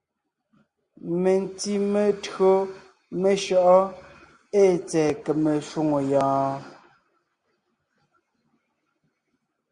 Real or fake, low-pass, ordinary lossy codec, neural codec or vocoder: real; 9.9 kHz; Opus, 64 kbps; none